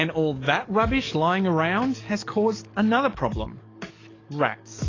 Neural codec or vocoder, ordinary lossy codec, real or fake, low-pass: codec, 44.1 kHz, 7.8 kbps, DAC; AAC, 32 kbps; fake; 7.2 kHz